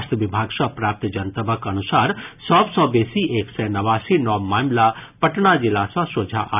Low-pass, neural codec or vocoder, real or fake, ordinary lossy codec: 3.6 kHz; none; real; none